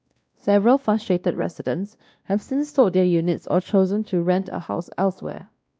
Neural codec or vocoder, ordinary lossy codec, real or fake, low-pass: codec, 16 kHz, 1 kbps, X-Codec, WavLM features, trained on Multilingual LibriSpeech; none; fake; none